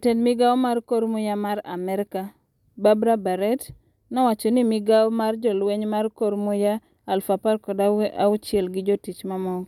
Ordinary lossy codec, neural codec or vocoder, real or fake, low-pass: none; none; real; 19.8 kHz